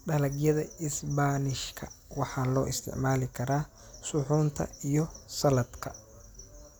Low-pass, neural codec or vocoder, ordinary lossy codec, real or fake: none; none; none; real